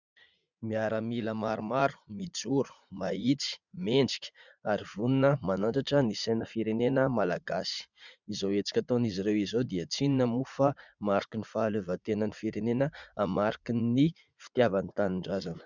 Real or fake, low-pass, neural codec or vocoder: fake; 7.2 kHz; vocoder, 44.1 kHz, 80 mel bands, Vocos